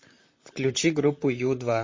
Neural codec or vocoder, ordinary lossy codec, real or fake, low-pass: vocoder, 44.1 kHz, 128 mel bands, Pupu-Vocoder; MP3, 32 kbps; fake; 7.2 kHz